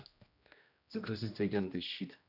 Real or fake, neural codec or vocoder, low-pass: fake; codec, 16 kHz, 1 kbps, X-Codec, HuBERT features, trained on general audio; 5.4 kHz